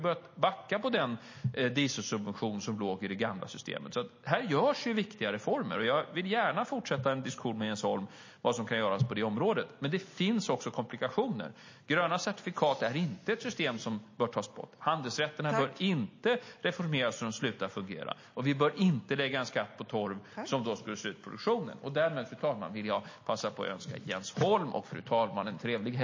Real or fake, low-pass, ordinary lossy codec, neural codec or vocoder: real; 7.2 kHz; MP3, 32 kbps; none